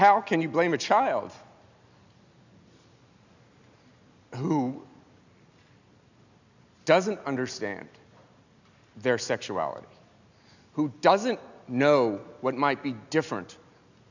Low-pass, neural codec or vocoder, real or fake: 7.2 kHz; none; real